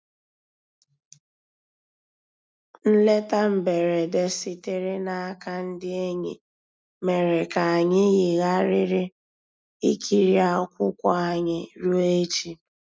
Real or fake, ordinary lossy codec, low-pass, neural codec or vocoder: real; none; none; none